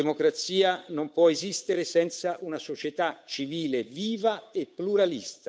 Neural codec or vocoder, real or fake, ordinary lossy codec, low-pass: codec, 16 kHz, 8 kbps, FunCodec, trained on Chinese and English, 25 frames a second; fake; none; none